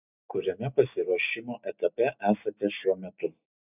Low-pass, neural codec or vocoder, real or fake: 3.6 kHz; none; real